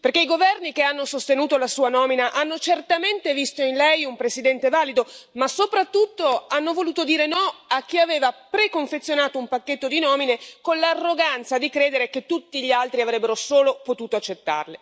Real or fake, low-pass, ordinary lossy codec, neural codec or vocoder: real; none; none; none